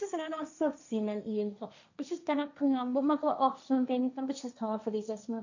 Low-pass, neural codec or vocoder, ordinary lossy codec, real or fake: 7.2 kHz; codec, 16 kHz, 1.1 kbps, Voila-Tokenizer; none; fake